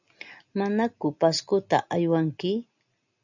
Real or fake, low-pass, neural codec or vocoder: real; 7.2 kHz; none